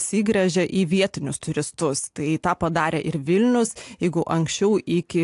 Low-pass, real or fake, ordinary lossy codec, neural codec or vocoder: 10.8 kHz; real; AAC, 64 kbps; none